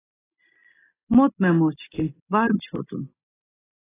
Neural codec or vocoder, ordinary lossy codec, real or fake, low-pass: none; AAC, 16 kbps; real; 3.6 kHz